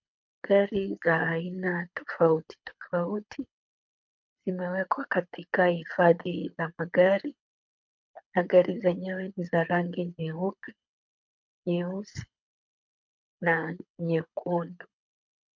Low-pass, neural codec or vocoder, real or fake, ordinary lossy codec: 7.2 kHz; codec, 24 kHz, 6 kbps, HILCodec; fake; MP3, 48 kbps